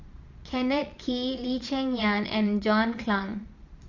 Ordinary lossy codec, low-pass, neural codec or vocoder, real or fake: Opus, 64 kbps; 7.2 kHz; vocoder, 22.05 kHz, 80 mel bands, Vocos; fake